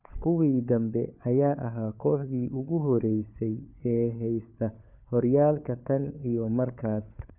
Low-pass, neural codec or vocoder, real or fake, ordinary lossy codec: 3.6 kHz; codec, 16 kHz, 4 kbps, FunCodec, trained on LibriTTS, 50 frames a second; fake; none